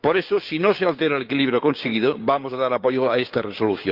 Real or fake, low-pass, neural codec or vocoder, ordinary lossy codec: fake; 5.4 kHz; codec, 16 kHz, 6 kbps, DAC; Opus, 24 kbps